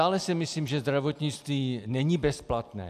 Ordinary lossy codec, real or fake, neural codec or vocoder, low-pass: AAC, 96 kbps; real; none; 14.4 kHz